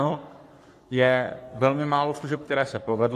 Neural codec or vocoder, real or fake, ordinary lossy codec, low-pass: codec, 44.1 kHz, 3.4 kbps, Pupu-Codec; fake; AAC, 64 kbps; 14.4 kHz